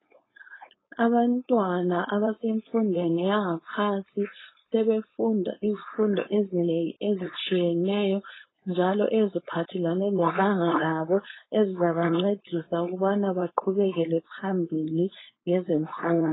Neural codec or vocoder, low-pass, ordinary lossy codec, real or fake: codec, 16 kHz, 4.8 kbps, FACodec; 7.2 kHz; AAC, 16 kbps; fake